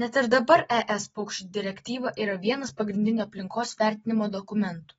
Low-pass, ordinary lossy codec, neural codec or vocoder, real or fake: 19.8 kHz; AAC, 24 kbps; none; real